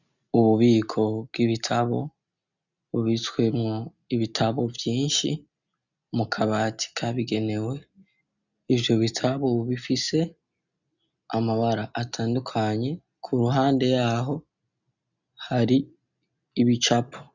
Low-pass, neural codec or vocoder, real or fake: 7.2 kHz; none; real